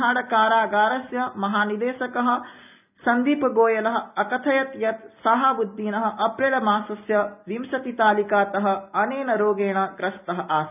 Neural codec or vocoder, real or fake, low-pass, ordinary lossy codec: none; real; 3.6 kHz; none